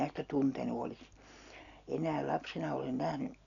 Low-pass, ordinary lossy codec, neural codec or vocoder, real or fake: 7.2 kHz; none; none; real